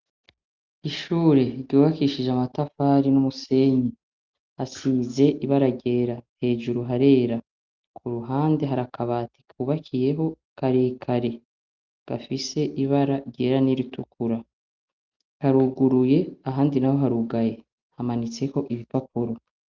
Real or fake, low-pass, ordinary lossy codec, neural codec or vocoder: real; 7.2 kHz; Opus, 32 kbps; none